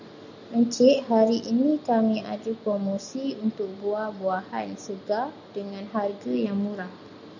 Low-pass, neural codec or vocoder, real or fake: 7.2 kHz; none; real